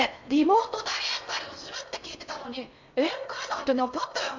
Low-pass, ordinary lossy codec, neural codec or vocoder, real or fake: 7.2 kHz; MP3, 64 kbps; codec, 16 kHz in and 24 kHz out, 0.6 kbps, FocalCodec, streaming, 4096 codes; fake